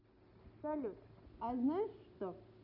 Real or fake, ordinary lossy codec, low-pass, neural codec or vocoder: real; none; 5.4 kHz; none